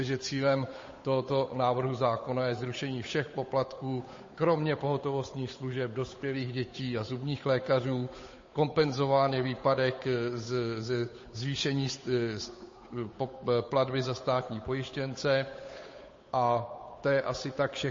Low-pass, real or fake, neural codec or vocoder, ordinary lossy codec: 7.2 kHz; fake; codec, 16 kHz, 8 kbps, FunCodec, trained on Chinese and English, 25 frames a second; MP3, 32 kbps